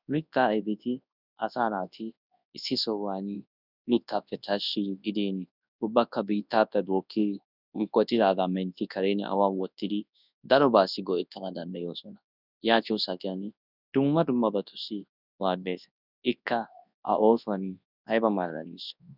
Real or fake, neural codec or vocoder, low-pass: fake; codec, 24 kHz, 0.9 kbps, WavTokenizer, large speech release; 5.4 kHz